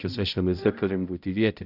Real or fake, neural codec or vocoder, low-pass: fake; codec, 16 kHz, 0.5 kbps, X-Codec, HuBERT features, trained on balanced general audio; 5.4 kHz